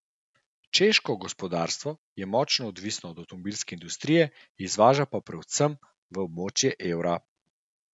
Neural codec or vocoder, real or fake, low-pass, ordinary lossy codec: none; real; 10.8 kHz; none